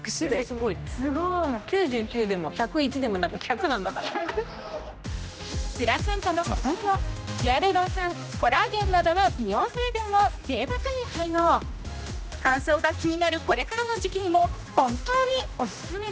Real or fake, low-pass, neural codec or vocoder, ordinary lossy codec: fake; none; codec, 16 kHz, 1 kbps, X-Codec, HuBERT features, trained on general audio; none